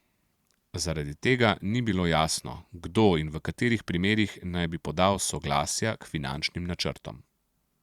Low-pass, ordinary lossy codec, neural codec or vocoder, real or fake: 19.8 kHz; Opus, 64 kbps; vocoder, 44.1 kHz, 128 mel bands every 512 samples, BigVGAN v2; fake